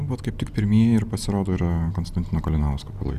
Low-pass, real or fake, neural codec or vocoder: 14.4 kHz; real; none